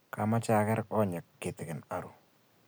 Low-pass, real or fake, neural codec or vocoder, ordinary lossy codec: none; real; none; none